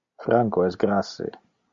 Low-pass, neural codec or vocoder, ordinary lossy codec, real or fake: 7.2 kHz; none; MP3, 64 kbps; real